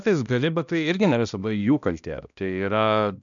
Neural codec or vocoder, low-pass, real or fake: codec, 16 kHz, 1 kbps, X-Codec, HuBERT features, trained on balanced general audio; 7.2 kHz; fake